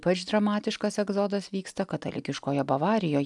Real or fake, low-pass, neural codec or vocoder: real; 10.8 kHz; none